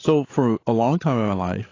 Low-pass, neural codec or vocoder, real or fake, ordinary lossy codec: 7.2 kHz; codec, 16 kHz, 16 kbps, FreqCodec, larger model; fake; AAC, 32 kbps